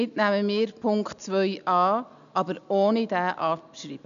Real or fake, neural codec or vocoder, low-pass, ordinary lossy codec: real; none; 7.2 kHz; none